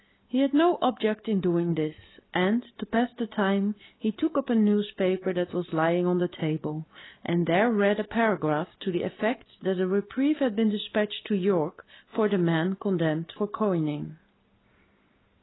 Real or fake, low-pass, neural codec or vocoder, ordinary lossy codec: real; 7.2 kHz; none; AAC, 16 kbps